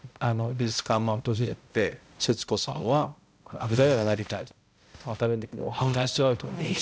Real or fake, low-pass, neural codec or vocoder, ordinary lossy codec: fake; none; codec, 16 kHz, 0.5 kbps, X-Codec, HuBERT features, trained on balanced general audio; none